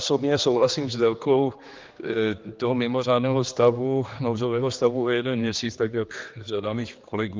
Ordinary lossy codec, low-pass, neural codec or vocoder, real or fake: Opus, 32 kbps; 7.2 kHz; codec, 16 kHz, 2 kbps, X-Codec, HuBERT features, trained on general audio; fake